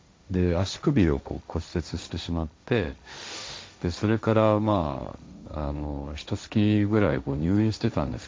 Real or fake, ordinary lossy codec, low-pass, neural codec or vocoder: fake; none; none; codec, 16 kHz, 1.1 kbps, Voila-Tokenizer